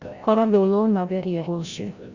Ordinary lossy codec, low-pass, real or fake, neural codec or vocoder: Opus, 64 kbps; 7.2 kHz; fake; codec, 16 kHz, 0.5 kbps, FreqCodec, larger model